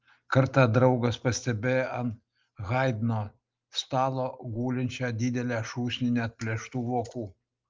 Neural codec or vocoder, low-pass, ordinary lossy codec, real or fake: none; 7.2 kHz; Opus, 32 kbps; real